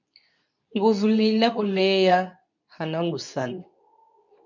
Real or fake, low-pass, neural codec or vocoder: fake; 7.2 kHz; codec, 24 kHz, 0.9 kbps, WavTokenizer, medium speech release version 2